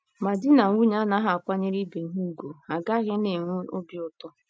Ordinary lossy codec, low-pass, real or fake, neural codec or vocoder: none; none; real; none